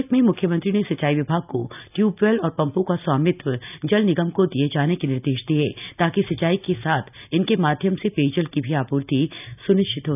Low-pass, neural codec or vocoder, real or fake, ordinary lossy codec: 3.6 kHz; none; real; none